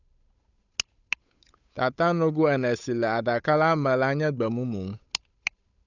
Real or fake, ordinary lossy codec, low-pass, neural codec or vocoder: fake; none; 7.2 kHz; codec, 16 kHz, 8 kbps, FunCodec, trained on Chinese and English, 25 frames a second